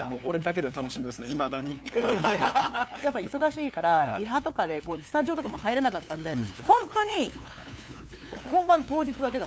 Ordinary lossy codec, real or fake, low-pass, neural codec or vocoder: none; fake; none; codec, 16 kHz, 2 kbps, FunCodec, trained on LibriTTS, 25 frames a second